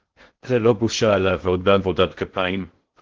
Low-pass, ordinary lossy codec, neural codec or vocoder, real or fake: 7.2 kHz; Opus, 16 kbps; codec, 16 kHz in and 24 kHz out, 0.6 kbps, FocalCodec, streaming, 2048 codes; fake